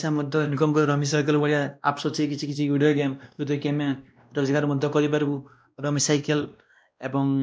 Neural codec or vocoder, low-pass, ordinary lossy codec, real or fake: codec, 16 kHz, 1 kbps, X-Codec, WavLM features, trained on Multilingual LibriSpeech; none; none; fake